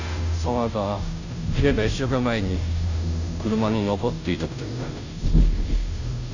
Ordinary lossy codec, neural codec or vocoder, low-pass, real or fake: none; codec, 16 kHz, 0.5 kbps, FunCodec, trained on Chinese and English, 25 frames a second; 7.2 kHz; fake